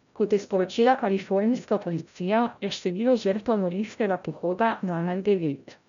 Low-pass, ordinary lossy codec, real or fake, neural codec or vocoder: 7.2 kHz; MP3, 96 kbps; fake; codec, 16 kHz, 0.5 kbps, FreqCodec, larger model